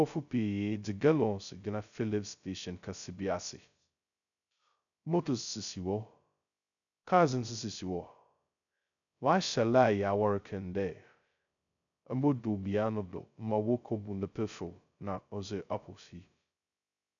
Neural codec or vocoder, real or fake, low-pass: codec, 16 kHz, 0.2 kbps, FocalCodec; fake; 7.2 kHz